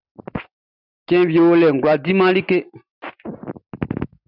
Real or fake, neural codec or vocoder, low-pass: real; none; 5.4 kHz